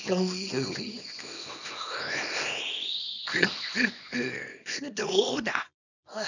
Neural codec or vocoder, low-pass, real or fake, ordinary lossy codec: codec, 24 kHz, 0.9 kbps, WavTokenizer, small release; 7.2 kHz; fake; none